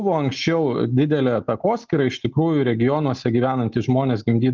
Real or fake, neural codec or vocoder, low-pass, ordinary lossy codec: real; none; 7.2 kHz; Opus, 24 kbps